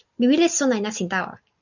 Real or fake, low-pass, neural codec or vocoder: real; 7.2 kHz; none